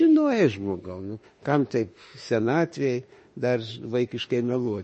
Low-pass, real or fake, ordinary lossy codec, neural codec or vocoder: 10.8 kHz; fake; MP3, 32 kbps; autoencoder, 48 kHz, 32 numbers a frame, DAC-VAE, trained on Japanese speech